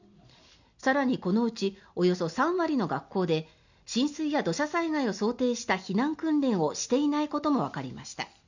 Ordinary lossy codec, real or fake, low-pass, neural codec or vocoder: none; real; 7.2 kHz; none